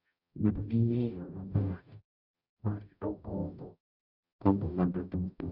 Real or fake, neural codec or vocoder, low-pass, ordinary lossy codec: fake; codec, 44.1 kHz, 0.9 kbps, DAC; 5.4 kHz; none